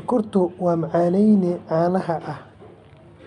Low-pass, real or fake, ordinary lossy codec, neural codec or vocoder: 10.8 kHz; real; MP3, 64 kbps; none